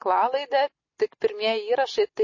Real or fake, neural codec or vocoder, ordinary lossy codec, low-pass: real; none; MP3, 32 kbps; 7.2 kHz